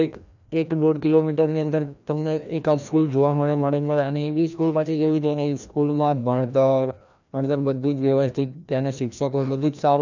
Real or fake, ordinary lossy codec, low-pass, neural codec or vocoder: fake; none; 7.2 kHz; codec, 16 kHz, 1 kbps, FreqCodec, larger model